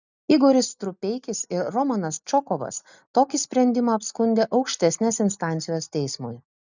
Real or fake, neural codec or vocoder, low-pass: real; none; 7.2 kHz